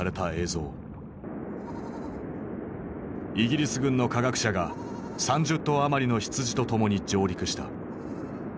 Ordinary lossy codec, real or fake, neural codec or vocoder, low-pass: none; real; none; none